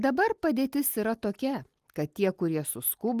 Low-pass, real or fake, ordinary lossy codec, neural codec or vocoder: 14.4 kHz; real; Opus, 24 kbps; none